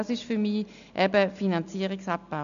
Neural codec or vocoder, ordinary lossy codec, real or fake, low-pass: none; none; real; 7.2 kHz